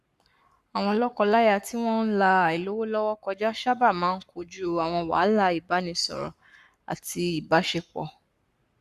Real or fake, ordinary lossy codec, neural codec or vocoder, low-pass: fake; Opus, 64 kbps; codec, 44.1 kHz, 7.8 kbps, Pupu-Codec; 14.4 kHz